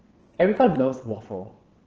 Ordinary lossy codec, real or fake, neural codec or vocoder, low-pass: Opus, 16 kbps; fake; vocoder, 22.05 kHz, 80 mel bands, WaveNeXt; 7.2 kHz